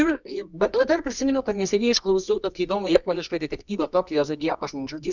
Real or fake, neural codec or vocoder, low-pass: fake; codec, 24 kHz, 0.9 kbps, WavTokenizer, medium music audio release; 7.2 kHz